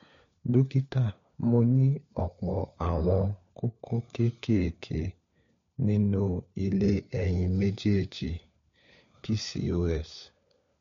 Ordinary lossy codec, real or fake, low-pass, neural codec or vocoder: MP3, 48 kbps; fake; 7.2 kHz; codec, 16 kHz, 4 kbps, FunCodec, trained on LibriTTS, 50 frames a second